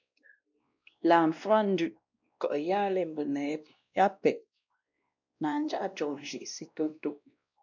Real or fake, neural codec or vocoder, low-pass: fake; codec, 16 kHz, 1 kbps, X-Codec, WavLM features, trained on Multilingual LibriSpeech; 7.2 kHz